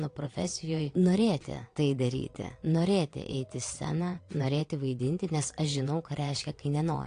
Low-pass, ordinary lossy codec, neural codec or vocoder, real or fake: 9.9 kHz; AAC, 48 kbps; vocoder, 22.05 kHz, 80 mel bands, WaveNeXt; fake